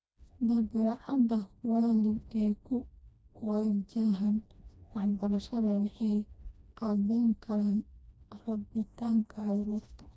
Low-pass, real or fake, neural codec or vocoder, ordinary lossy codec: none; fake; codec, 16 kHz, 1 kbps, FreqCodec, smaller model; none